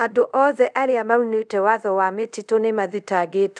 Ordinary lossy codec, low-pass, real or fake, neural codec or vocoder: none; none; fake; codec, 24 kHz, 0.5 kbps, DualCodec